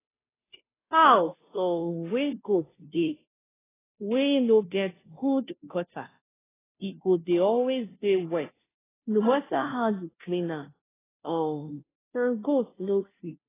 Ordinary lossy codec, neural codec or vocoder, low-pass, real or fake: AAC, 16 kbps; codec, 16 kHz, 0.5 kbps, FunCodec, trained on Chinese and English, 25 frames a second; 3.6 kHz; fake